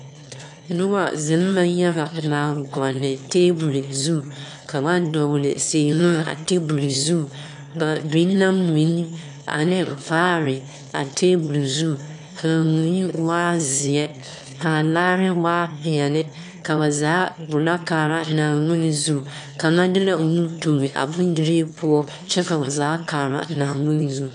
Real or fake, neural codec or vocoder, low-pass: fake; autoencoder, 22.05 kHz, a latent of 192 numbers a frame, VITS, trained on one speaker; 9.9 kHz